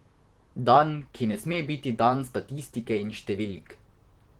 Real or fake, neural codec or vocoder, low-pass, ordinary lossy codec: fake; vocoder, 44.1 kHz, 128 mel bands, Pupu-Vocoder; 19.8 kHz; Opus, 16 kbps